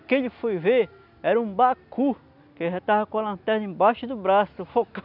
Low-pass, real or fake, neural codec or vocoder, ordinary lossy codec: 5.4 kHz; real; none; none